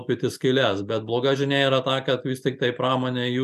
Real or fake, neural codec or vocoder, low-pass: real; none; 14.4 kHz